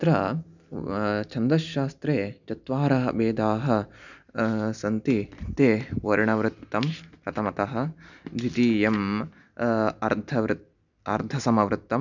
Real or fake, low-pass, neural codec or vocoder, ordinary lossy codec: real; 7.2 kHz; none; none